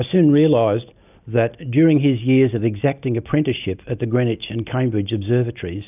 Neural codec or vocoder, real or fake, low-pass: none; real; 3.6 kHz